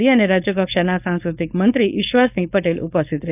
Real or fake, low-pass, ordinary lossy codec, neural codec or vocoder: fake; 3.6 kHz; none; codec, 16 kHz, 4.8 kbps, FACodec